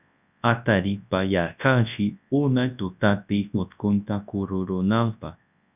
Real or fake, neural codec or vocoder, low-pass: fake; codec, 24 kHz, 0.9 kbps, WavTokenizer, large speech release; 3.6 kHz